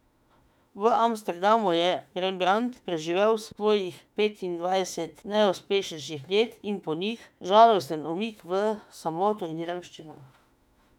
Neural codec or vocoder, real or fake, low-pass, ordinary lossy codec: autoencoder, 48 kHz, 32 numbers a frame, DAC-VAE, trained on Japanese speech; fake; 19.8 kHz; none